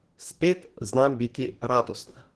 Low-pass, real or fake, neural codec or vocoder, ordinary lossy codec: 10.8 kHz; fake; codec, 44.1 kHz, 2.6 kbps, DAC; Opus, 16 kbps